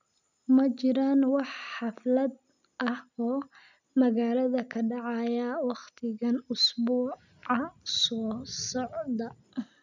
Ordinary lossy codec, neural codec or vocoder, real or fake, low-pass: none; none; real; 7.2 kHz